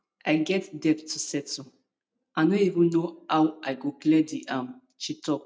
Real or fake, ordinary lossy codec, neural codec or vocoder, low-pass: real; none; none; none